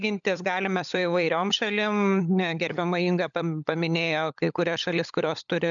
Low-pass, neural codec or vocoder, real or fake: 7.2 kHz; codec, 16 kHz, 16 kbps, FunCodec, trained on LibriTTS, 50 frames a second; fake